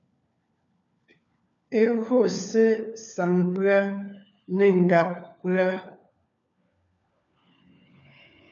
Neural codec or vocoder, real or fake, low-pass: codec, 16 kHz, 4 kbps, FunCodec, trained on LibriTTS, 50 frames a second; fake; 7.2 kHz